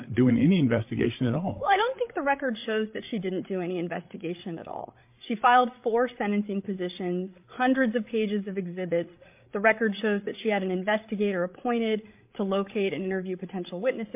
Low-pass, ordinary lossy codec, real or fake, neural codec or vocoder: 3.6 kHz; MP3, 32 kbps; fake; codec, 16 kHz, 8 kbps, FreqCodec, larger model